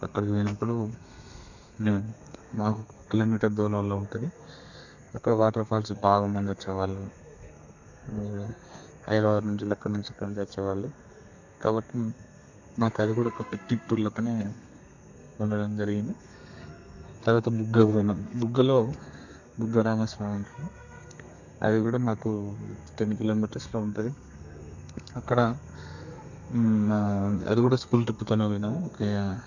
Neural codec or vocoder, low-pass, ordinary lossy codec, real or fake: codec, 32 kHz, 1.9 kbps, SNAC; 7.2 kHz; none; fake